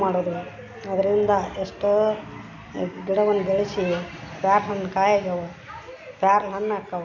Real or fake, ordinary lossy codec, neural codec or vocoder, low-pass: real; none; none; 7.2 kHz